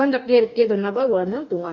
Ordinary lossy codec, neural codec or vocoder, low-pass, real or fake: none; codec, 44.1 kHz, 2.6 kbps, DAC; 7.2 kHz; fake